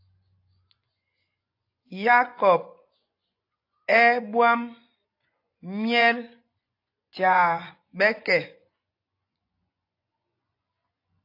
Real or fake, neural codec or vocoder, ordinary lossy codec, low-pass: fake; vocoder, 24 kHz, 100 mel bands, Vocos; AAC, 32 kbps; 5.4 kHz